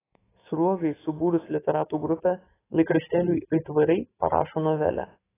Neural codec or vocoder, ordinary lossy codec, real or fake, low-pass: autoencoder, 48 kHz, 128 numbers a frame, DAC-VAE, trained on Japanese speech; AAC, 16 kbps; fake; 3.6 kHz